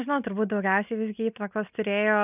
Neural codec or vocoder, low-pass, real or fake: none; 3.6 kHz; real